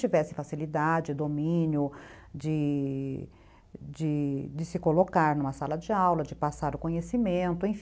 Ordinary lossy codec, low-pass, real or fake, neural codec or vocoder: none; none; real; none